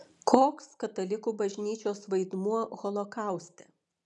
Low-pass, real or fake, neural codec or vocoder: 10.8 kHz; real; none